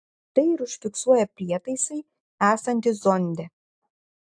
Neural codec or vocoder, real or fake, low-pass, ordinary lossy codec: none; real; 9.9 kHz; AAC, 64 kbps